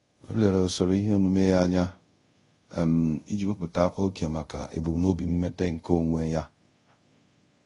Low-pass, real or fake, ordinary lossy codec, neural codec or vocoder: 10.8 kHz; fake; AAC, 32 kbps; codec, 24 kHz, 0.5 kbps, DualCodec